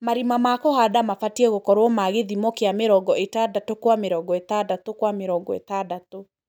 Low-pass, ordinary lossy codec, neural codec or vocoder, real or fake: none; none; none; real